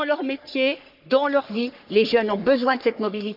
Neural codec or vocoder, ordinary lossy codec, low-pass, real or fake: codec, 44.1 kHz, 3.4 kbps, Pupu-Codec; none; 5.4 kHz; fake